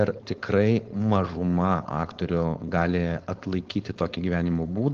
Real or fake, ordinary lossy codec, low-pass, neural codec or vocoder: fake; Opus, 16 kbps; 7.2 kHz; codec, 16 kHz, 4.8 kbps, FACodec